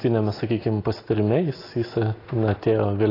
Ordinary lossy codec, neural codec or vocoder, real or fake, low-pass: AAC, 24 kbps; none; real; 5.4 kHz